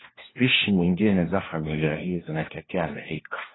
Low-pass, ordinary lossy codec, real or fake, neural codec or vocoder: 7.2 kHz; AAC, 16 kbps; fake; codec, 16 kHz, 1 kbps, FunCodec, trained on LibriTTS, 50 frames a second